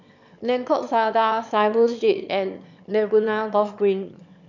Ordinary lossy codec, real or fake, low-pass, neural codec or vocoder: none; fake; 7.2 kHz; autoencoder, 22.05 kHz, a latent of 192 numbers a frame, VITS, trained on one speaker